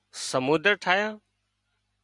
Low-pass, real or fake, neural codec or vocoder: 10.8 kHz; real; none